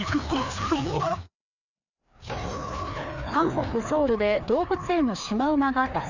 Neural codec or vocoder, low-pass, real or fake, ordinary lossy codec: codec, 16 kHz, 2 kbps, FreqCodec, larger model; 7.2 kHz; fake; none